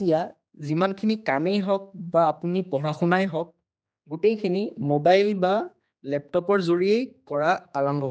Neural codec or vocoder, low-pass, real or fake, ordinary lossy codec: codec, 16 kHz, 2 kbps, X-Codec, HuBERT features, trained on general audio; none; fake; none